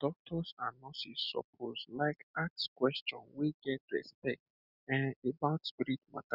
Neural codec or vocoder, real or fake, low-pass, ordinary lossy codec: none; real; 5.4 kHz; none